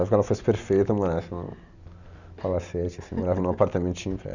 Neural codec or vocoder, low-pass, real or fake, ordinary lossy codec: none; 7.2 kHz; real; none